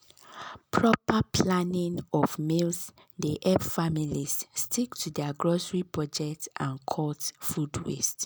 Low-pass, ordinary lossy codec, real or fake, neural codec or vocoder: none; none; real; none